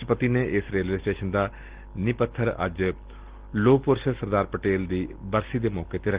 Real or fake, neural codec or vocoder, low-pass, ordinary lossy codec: real; none; 3.6 kHz; Opus, 16 kbps